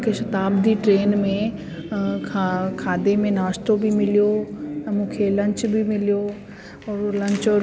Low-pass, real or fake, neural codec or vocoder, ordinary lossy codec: none; real; none; none